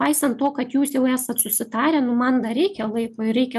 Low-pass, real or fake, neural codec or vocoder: 14.4 kHz; real; none